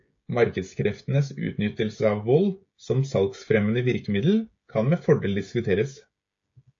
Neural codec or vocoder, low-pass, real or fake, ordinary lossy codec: codec, 16 kHz, 16 kbps, FreqCodec, smaller model; 7.2 kHz; fake; AAC, 48 kbps